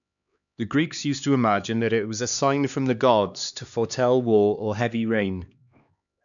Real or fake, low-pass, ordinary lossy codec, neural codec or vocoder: fake; 7.2 kHz; none; codec, 16 kHz, 2 kbps, X-Codec, HuBERT features, trained on LibriSpeech